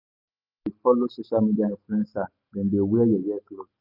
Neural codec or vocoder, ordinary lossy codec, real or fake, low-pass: none; none; real; 5.4 kHz